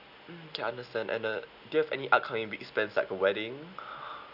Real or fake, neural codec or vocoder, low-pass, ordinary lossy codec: real; none; 5.4 kHz; none